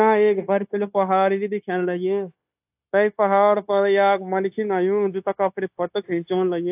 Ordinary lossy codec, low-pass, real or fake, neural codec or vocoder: AAC, 32 kbps; 3.6 kHz; fake; codec, 16 kHz, 0.9 kbps, LongCat-Audio-Codec